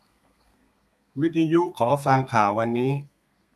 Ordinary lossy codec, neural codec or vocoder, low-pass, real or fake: none; codec, 32 kHz, 1.9 kbps, SNAC; 14.4 kHz; fake